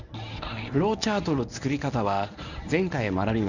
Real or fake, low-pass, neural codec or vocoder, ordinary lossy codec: fake; 7.2 kHz; codec, 24 kHz, 0.9 kbps, WavTokenizer, medium speech release version 1; none